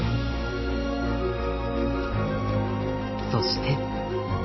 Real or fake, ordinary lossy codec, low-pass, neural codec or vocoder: real; MP3, 24 kbps; 7.2 kHz; none